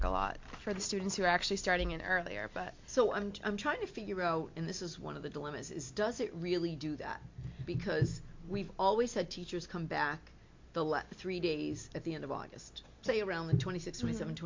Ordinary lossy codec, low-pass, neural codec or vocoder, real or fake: MP3, 48 kbps; 7.2 kHz; none; real